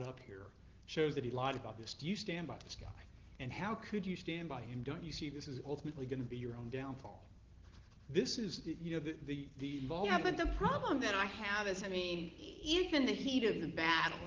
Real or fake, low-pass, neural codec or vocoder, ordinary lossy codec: real; 7.2 kHz; none; Opus, 16 kbps